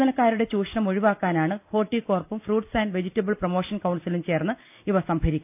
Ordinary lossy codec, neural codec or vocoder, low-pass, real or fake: AAC, 32 kbps; none; 3.6 kHz; real